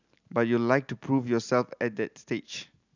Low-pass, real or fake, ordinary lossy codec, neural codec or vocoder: 7.2 kHz; real; none; none